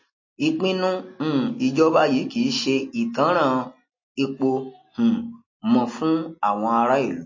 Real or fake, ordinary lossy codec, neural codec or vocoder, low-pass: real; MP3, 32 kbps; none; 7.2 kHz